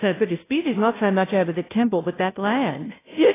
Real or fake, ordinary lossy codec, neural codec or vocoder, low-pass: fake; AAC, 16 kbps; codec, 16 kHz, 0.5 kbps, FunCodec, trained on Chinese and English, 25 frames a second; 3.6 kHz